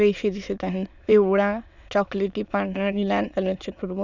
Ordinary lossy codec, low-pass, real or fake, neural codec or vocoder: none; 7.2 kHz; fake; autoencoder, 22.05 kHz, a latent of 192 numbers a frame, VITS, trained on many speakers